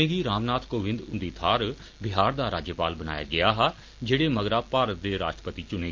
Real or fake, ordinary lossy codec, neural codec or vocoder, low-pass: real; Opus, 32 kbps; none; 7.2 kHz